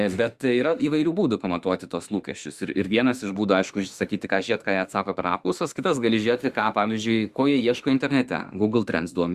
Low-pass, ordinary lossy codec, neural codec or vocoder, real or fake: 14.4 kHz; Opus, 64 kbps; autoencoder, 48 kHz, 32 numbers a frame, DAC-VAE, trained on Japanese speech; fake